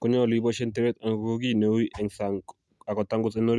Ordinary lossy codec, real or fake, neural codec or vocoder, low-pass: none; real; none; none